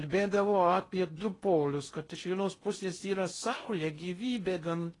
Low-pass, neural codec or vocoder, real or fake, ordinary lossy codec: 10.8 kHz; codec, 16 kHz in and 24 kHz out, 0.6 kbps, FocalCodec, streaming, 2048 codes; fake; AAC, 32 kbps